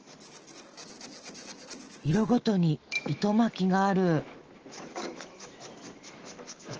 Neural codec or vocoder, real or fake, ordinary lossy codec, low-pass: autoencoder, 48 kHz, 128 numbers a frame, DAC-VAE, trained on Japanese speech; fake; Opus, 16 kbps; 7.2 kHz